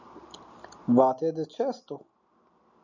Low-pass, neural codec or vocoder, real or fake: 7.2 kHz; none; real